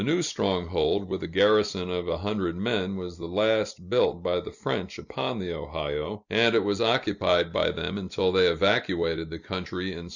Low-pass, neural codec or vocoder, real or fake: 7.2 kHz; none; real